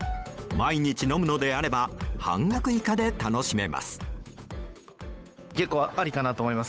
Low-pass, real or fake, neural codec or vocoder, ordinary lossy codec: none; fake; codec, 16 kHz, 8 kbps, FunCodec, trained on Chinese and English, 25 frames a second; none